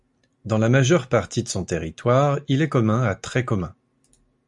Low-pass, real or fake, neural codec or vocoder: 10.8 kHz; real; none